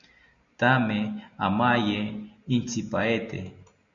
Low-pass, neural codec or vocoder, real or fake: 7.2 kHz; none; real